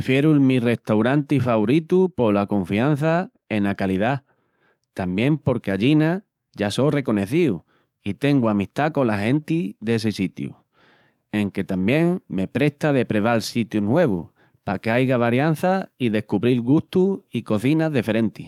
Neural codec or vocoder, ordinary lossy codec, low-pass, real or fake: codec, 44.1 kHz, 7.8 kbps, DAC; none; 19.8 kHz; fake